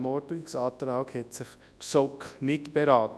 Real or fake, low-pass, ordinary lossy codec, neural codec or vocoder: fake; none; none; codec, 24 kHz, 0.9 kbps, WavTokenizer, large speech release